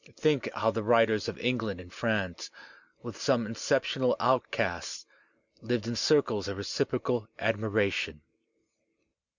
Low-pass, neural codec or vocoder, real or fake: 7.2 kHz; none; real